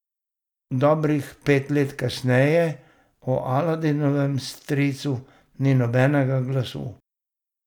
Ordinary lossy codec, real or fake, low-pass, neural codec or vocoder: none; real; 19.8 kHz; none